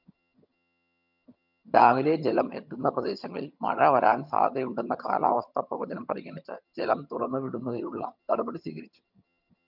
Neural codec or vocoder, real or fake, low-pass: vocoder, 22.05 kHz, 80 mel bands, HiFi-GAN; fake; 5.4 kHz